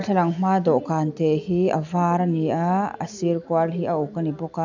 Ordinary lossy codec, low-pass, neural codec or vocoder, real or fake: none; 7.2 kHz; none; real